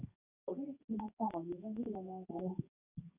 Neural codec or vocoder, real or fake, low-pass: codec, 24 kHz, 0.9 kbps, WavTokenizer, medium speech release version 2; fake; 3.6 kHz